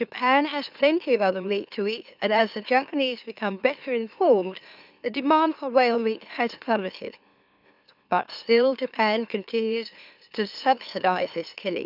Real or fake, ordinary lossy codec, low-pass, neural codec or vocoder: fake; none; 5.4 kHz; autoencoder, 44.1 kHz, a latent of 192 numbers a frame, MeloTTS